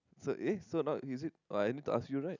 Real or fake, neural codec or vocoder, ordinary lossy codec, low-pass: real; none; none; 7.2 kHz